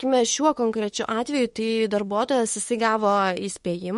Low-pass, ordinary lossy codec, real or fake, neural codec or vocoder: 19.8 kHz; MP3, 48 kbps; fake; autoencoder, 48 kHz, 32 numbers a frame, DAC-VAE, trained on Japanese speech